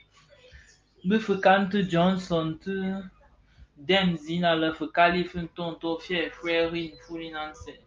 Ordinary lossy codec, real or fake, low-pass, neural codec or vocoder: Opus, 24 kbps; real; 7.2 kHz; none